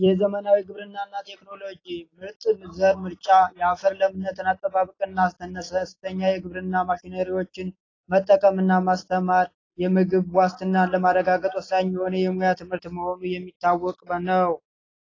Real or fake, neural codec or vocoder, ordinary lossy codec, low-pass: real; none; AAC, 32 kbps; 7.2 kHz